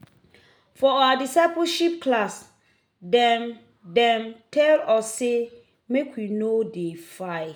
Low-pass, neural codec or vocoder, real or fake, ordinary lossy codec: none; none; real; none